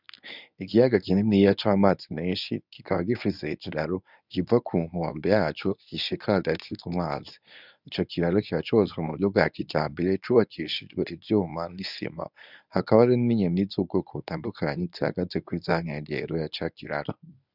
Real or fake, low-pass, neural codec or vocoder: fake; 5.4 kHz; codec, 24 kHz, 0.9 kbps, WavTokenizer, medium speech release version 1